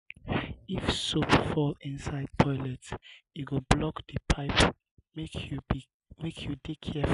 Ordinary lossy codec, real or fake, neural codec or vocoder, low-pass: none; real; none; 10.8 kHz